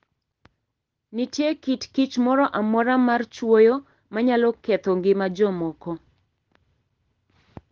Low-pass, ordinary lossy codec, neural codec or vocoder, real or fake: 7.2 kHz; Opus, 16 kbps; none; real